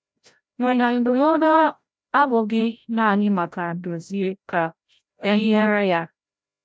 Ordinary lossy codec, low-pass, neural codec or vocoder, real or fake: none; none; codec, 16 kHz, 0.5 kbps, FreqCodec, larger model; fake